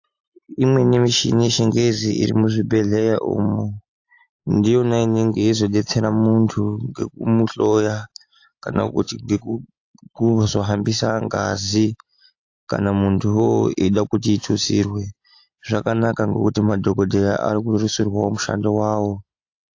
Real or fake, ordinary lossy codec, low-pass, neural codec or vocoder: real; AAC, 48 kbps; 7.2 kHz; none